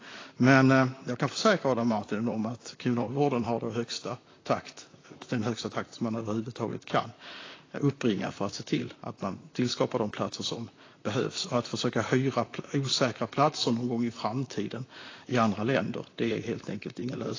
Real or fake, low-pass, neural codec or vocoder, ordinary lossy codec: fake; 7.2 kHz; vocoder, 44.1 kHz, 128 mel bands, Pupu-Vocoder; AAC, 32 kbps